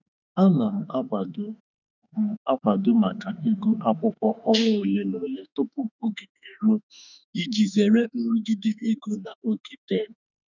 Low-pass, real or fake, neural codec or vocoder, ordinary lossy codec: 7.2 kHz; fake; autoencoder, 48 kHz, 32 numbers a frame, DAC-VAE, trained on Japanese speech; none